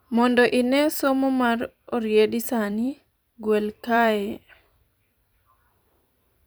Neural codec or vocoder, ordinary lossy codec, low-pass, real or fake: vocoder, 44.1 kHz, 128 mel bands every 256 samples, BigVGAN v2; none; none; fake